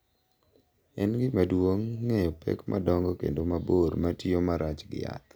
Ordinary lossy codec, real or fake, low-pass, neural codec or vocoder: none; real; none; none